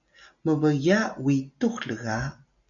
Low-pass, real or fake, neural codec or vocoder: 7.2 kHz; real; none